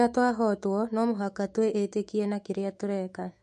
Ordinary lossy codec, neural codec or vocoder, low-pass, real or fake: MP3, 48 kbps; autoencoder, 48 kHz, 128 numbers a frame, DAC-VAE, trained on Japanese speech; 14.4 kHz; fake